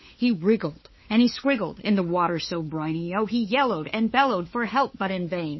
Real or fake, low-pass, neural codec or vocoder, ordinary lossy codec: fake; 7.2 kHz; codec, 16 kHz, 4 kbps, FunCodec, trained on LibriTTS, 50 frames a second; MP3, 24 kbps